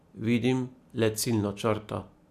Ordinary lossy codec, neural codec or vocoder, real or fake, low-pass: none; none; real; 14.4 kHz